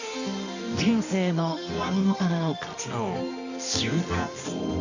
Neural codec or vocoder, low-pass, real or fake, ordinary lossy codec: codec, 24 kHz, 0.9 kbps, WavTokenizer, medium music audio release; 7.2 kHz; fake; none